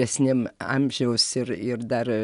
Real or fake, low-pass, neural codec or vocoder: real; 10.8 kHz; none